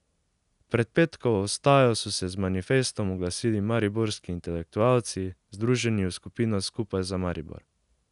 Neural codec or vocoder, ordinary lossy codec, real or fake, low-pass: none; none; real; 10.8 kHz